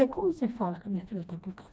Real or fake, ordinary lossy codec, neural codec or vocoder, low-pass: fake; none; codec, 16 kHz, 1 kbps, FreqCodec, smaller model; none